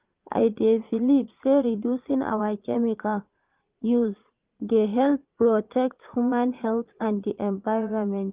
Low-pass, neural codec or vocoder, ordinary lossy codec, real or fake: 3.6 kHz; vocoder, 22.05 kHz, 80 mel bands, Vocos; Opus, 24 kbps; fake